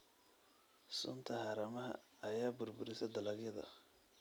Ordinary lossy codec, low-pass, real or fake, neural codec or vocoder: none; none; real; none